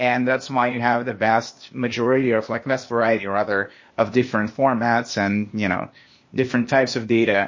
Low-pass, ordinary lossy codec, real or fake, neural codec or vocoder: 7.2 kHz; MP3, 32 kbps; fake; codec, 16 kHz, 0.8 kbps, ZipCodec